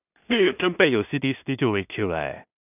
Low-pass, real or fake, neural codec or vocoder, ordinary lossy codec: 3.6 kHz; fake; codec, 16 kHz in and 24 kHz out, 0.4 kbps, LongCat-Audio-Codec, two codebook decoder; none